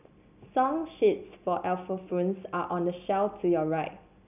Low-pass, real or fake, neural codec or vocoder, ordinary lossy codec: 3.6 kHz; real; none; none